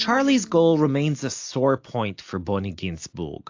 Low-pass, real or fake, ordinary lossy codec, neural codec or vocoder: 7.2 kHz; real; AAC, 48 kbps; none